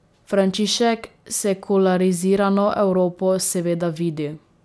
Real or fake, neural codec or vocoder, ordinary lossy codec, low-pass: real; none; none; none